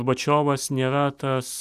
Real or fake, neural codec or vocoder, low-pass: real; none; 14.4 kHz